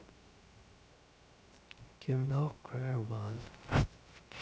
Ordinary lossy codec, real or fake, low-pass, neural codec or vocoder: none; fake; none; codec, 16 kHz, 0.3 kbps, FocalCodec